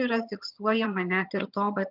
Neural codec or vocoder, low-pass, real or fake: vocoder, 22.05 kHz, 80 mel bands, HiFi-GAN; 5.4 kHz; fake